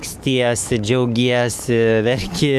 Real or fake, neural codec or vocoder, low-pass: fake; codec, 44.1 kHz, 7.8 kbps, DAC; 14.4 kHz